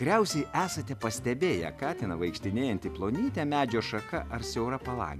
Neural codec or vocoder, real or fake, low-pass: none; real; 14.4 kHz